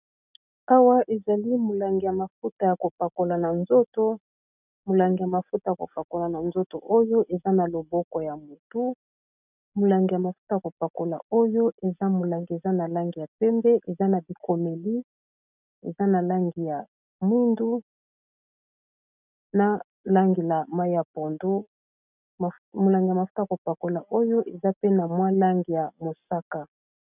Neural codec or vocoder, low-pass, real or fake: none; 3.6 kHz; real